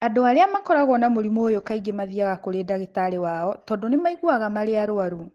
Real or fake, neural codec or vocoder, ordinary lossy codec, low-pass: real; none; Opus, 16 kbps; 14.4 kHz